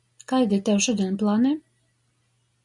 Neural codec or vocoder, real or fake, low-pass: none; real; 10.8 kHz